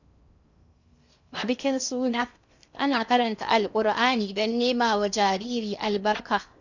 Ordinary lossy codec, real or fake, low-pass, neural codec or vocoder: none; fake; 7.2 kHz; codec, 16 kHz in and 24 kHz out, 0.6 kbps, FocalCodec, streaming, 2048 codes